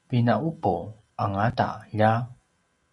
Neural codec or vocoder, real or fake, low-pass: none; real; 10.8 kHz